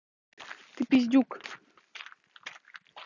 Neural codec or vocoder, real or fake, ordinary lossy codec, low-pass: none; real; none; 7.2 kHz